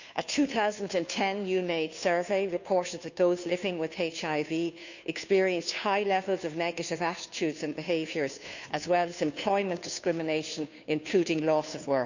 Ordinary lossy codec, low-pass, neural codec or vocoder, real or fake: none; 7.2 kHz; codec, 16 kHz, 2 kbps, FunCodec, trained on Chinese and English, 25 frames a second; fake